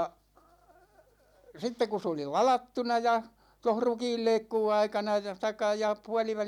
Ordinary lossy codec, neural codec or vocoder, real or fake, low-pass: none; none; real; 19.8 kHz